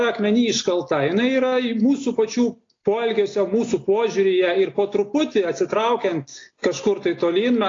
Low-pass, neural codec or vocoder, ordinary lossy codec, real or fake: 7.2 kHz; none; AAC, 32 kbps; real